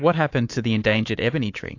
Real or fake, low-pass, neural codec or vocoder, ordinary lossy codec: fake; 7.2 kHz; codec, 24 kHz, 3.1 kbps, DualCodec; AAC, 48 kbps